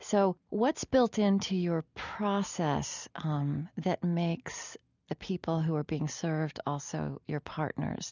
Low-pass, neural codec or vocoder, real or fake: 7.2 kHz; none; real